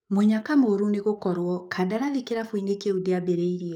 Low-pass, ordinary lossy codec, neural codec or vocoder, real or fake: 19.8 kHz; none; codec, 44.1 kHz, 7.8 kbps, DAC; fake